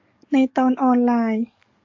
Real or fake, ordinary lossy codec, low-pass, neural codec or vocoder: fake; MP3, 48 kbps; 7.2 kHz; codec, 44.1 kHz, 7.8 kbps, DAC